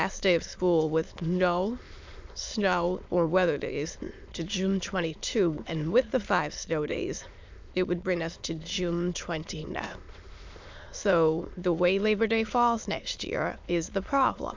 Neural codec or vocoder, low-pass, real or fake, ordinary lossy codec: autoencoder, 22.05 kHz, a latent of 192 numbers a frame, VITS, trained on many speakers; 7.2 kHz; fake; MP3, 64 kbps